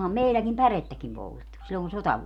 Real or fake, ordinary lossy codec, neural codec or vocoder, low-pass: real; none; none; 19.8 kHz